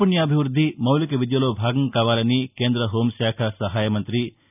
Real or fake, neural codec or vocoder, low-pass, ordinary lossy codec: real; none; 3.6 kHz; none